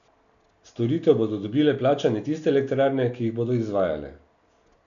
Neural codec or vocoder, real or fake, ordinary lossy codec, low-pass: none; real; none; 7.2 kHz